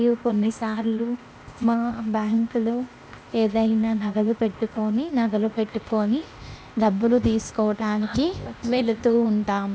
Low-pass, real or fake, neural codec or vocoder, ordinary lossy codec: none; fake; codec, 16 kHz, 0.8 kbps, ZipCodec; none